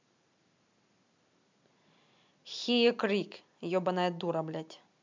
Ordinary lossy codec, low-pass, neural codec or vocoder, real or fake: MP3, 64 kbps; 7.2 kHz; none; real